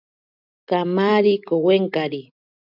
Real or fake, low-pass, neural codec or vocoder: real; 5.4 kHz; none